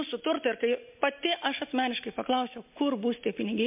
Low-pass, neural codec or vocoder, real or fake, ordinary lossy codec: 3.6 kHz; none; real; MP3, 32 kbps